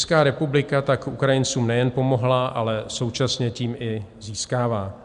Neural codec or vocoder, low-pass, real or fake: none; 10.8 kHz; real